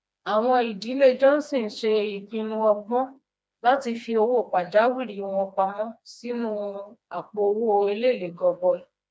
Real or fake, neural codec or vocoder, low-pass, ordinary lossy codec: fake; codec, 16 kHz, 2 kbps, FreqCodec, smaller model; none; none